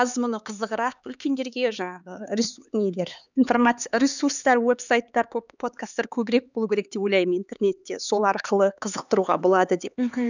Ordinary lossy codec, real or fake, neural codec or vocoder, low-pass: none; fake; codec, 16 kHz, 4 kbps, X-Codec, HuBERT features, trained on LibriSpeech; 7.2 kHz